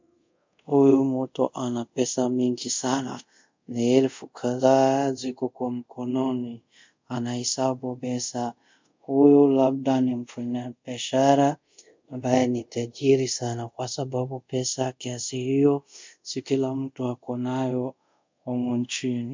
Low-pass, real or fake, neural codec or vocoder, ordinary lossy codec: 7.2 kHz; fake; codec, 24 kHz, 0.5 kbps, DualCodec; MP3, 64 kbps